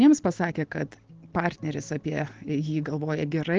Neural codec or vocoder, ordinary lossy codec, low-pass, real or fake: none; Opus, 16 kbps; 7.2 kHz; real